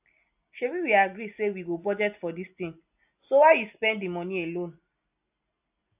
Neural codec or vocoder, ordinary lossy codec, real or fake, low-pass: none; AAC, 32 kbps; real; 3.6 kHz